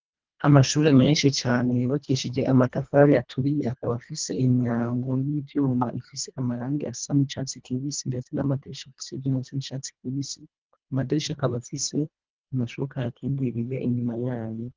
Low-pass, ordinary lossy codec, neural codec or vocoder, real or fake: 7.2 kHz; Opus, 32 kbps; codec, 24 kHz, 1.5 kbps, HILCodec; fake